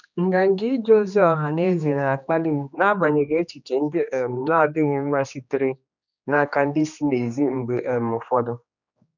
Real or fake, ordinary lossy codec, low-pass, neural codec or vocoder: fake; none; 7.2 kHz; codec, 16 kHz, 2 kbps, X-Codec, HuBERT features, trained on general audio